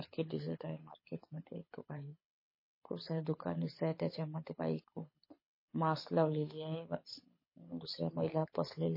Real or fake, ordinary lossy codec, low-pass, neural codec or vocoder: fake; MP3, 24 kbps; 5.4 kHz; codec, 24 kHz, 6 kbps, HILCodec